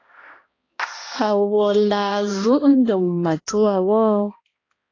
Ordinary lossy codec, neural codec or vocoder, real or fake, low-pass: AAC, 32 kbps; codec, 16 kHz, 1 kbps, X-Codec, HuBERT features, trained on balanced general audio; fake; 7.2 kHz